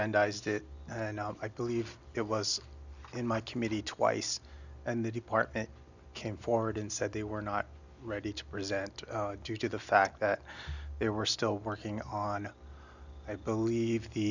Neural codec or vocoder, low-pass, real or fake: none; 7.2 kHz; real